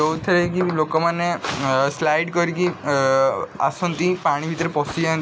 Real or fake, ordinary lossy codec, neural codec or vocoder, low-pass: real; none; none; none